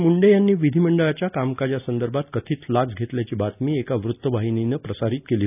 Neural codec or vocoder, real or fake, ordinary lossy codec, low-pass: none; real; none; 3.6 kHz